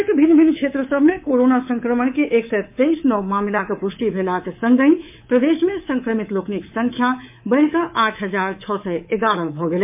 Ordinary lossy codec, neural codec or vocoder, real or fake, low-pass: MP3, 32 kbps; codec, 24 kHz, 3.1 kbps, DualCodec; fake; 3.6 kHz